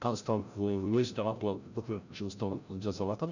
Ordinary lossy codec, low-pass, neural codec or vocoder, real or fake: none; 7.2 kHz; codec, 16 kHz, 0.5 kbps, FreqCodec, larger model; fake